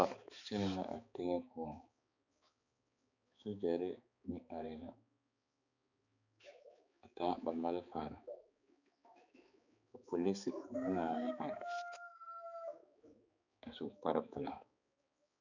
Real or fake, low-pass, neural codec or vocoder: fake; 7.2 kHz; codec, 16 kHz, 4 kbps, X-Codec, HuBERT features, trained on balanced general audio